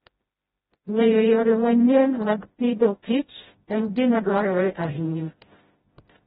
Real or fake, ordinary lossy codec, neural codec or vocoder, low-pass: fake; AAC, 16 kbps; codec, 16 kHz, 0.5 kbps, FreqCodec, smaller model; 7.2 kHz